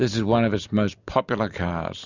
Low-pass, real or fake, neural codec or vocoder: 7.2 kHz; real; none